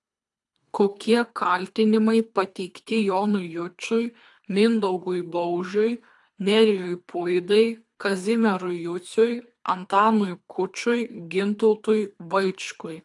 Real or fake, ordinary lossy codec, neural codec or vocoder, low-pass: fake; AAC, 64 kbps; codec, 24 kHz, 3 kbps, HILCodec; 10.8 kHz